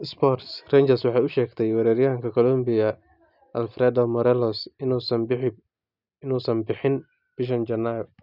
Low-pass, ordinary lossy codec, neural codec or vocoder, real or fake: 5.4 kHz; none; none; real